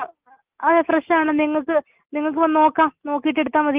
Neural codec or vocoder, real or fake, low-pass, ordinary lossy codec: none; real; 3.6 kHz; Opus, 24 kbps